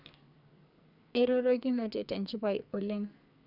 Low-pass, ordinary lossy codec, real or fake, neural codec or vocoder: 5.4 kHz; none; fake; codec, 44.1 kHz, 2.6 kbps, SNAC